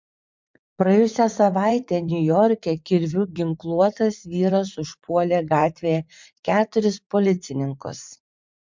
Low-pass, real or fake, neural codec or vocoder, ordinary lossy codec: 7.2 kHz; fake; vocoder, 44.1 kHz, 80 mel bands, Vocos; MP3, 64 kbps